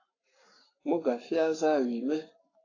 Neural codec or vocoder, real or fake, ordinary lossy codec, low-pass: codec, 44.1 kHz, 7.8 kbps, Pupu-Codec; fake; AAC, 32 kbps; 7.2 kHz